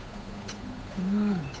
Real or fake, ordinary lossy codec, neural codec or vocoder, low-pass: fake; none; codec, 16 kHz, 8 kbps, FunCodec, trained on Chinese and English, 25 frames a second; none